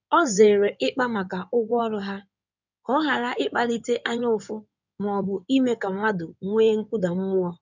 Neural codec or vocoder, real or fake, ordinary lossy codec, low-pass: codec, 16 kHz in and 24 kHz out, 2.2 kbps, FireRedTTS-2 codec; fake; none; 7.2 kHz